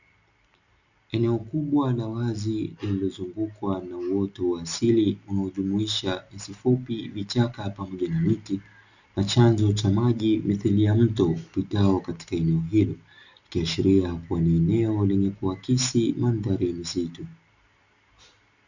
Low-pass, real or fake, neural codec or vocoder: 7.2 kHz; real; none